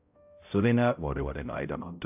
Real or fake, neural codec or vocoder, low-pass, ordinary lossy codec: fake; codec, 16 kHz, 0.5 kbps, X-Codec, HuBERT features, trained on balanced general audio; 3.6 kHz; AAC, 32 kbps